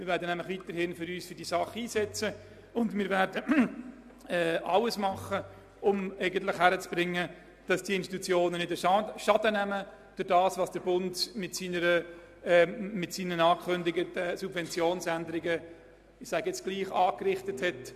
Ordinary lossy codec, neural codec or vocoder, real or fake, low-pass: AAC, 96 kbps; none; real; 14.4 kHz